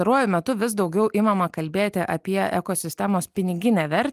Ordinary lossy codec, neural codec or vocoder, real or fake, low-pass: Opus, 32 kbps; none; real; 14.4 kHz